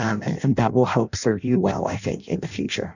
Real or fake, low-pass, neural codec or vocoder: fake; 7.2 kHz; codec, 16 kHz in and 24 kHz out, 0.6 kbps, FireRedTTS-2 codec